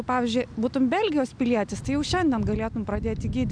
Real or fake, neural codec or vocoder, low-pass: real; none; 9.9 kHz